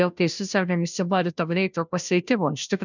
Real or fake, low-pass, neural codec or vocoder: fake; 7.2 kHz; codec, 16 kHz, 0.5 kbps, FunCodec, trained on Chinese and English, 25 frames a second